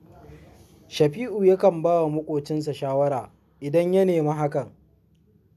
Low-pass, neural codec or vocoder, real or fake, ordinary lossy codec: 14.4 kHz; none; real; none